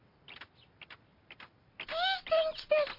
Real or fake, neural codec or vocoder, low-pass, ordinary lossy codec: real; none; 5.4 kHz; MP3, 32 kbps